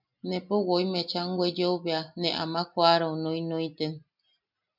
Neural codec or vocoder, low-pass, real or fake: none; 5.4 kHz; real